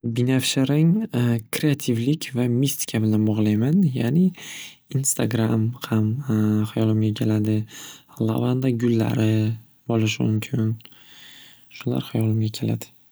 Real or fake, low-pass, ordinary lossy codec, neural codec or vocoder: real; none; none; none